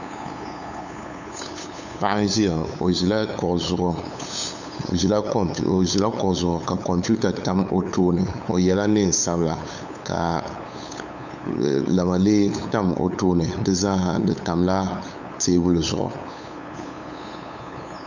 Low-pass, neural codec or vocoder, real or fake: 7.2 kHz; codec, 16 kHz, 8 kbps, FunCodec, trained on LibriTTS, 25 frames a second; fake